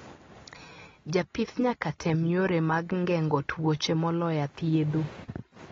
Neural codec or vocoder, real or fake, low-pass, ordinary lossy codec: none; real; 7.2 kHz; AAC, 24 kbps